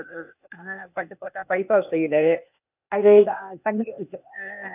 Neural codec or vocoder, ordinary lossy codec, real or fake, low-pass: codec, 16 kHz, 0.8 kbps, ZipCodec; none; fake; 3.6 kHz